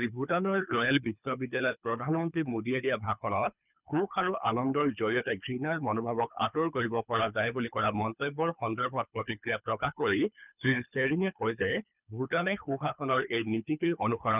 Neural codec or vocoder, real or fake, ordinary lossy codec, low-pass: codec, 24 kHz, 3 kbps, HILCodec; fake; none; 3.6 kHz